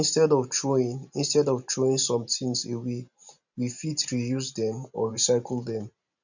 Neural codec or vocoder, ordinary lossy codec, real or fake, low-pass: vocoder, 44.1 kHz, 128 mel bands every 512 samples, BigVGAN v2; none; fake; 7.2 kHz